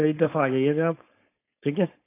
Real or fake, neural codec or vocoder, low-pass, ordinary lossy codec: fake; codec, 16 kHz, 4.8 kbps, FACodec; 3.6 kHz; AAC, 24 kbps